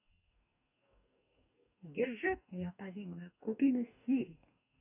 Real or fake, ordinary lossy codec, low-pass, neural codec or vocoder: fake; none; 3.6 kHz; codec, 44.1 kHz, 2.6 kbps, DAC